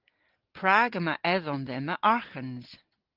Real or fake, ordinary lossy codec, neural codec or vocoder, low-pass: real; Opus, 16 kbps; none; 5.4 kHz